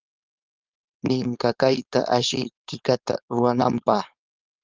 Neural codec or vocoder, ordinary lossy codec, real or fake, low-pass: codec, 16 kHz, 4.8 kbps, FACodec; Opus, 24 kbps; fake; 7.2 kHz